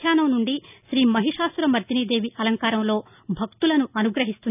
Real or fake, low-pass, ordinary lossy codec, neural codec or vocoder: real; 3.6 kHz; none; none